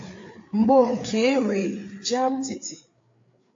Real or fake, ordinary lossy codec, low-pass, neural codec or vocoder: fake; AAC, 48 kbps; 7.2 kHz; codec, 16 kHz, 4 kbps, FreqCodec, larger model